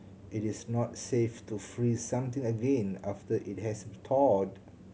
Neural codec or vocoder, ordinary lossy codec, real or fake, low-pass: none; none; real; none